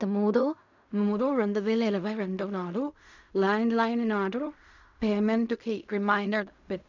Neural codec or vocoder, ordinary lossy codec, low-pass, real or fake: codec, 16 kHz in and 24 kHz out, 0.4 kbps, LongCat-Audio-Codec, fine tuned four codebook decoder; none; 7.2 kHz; fake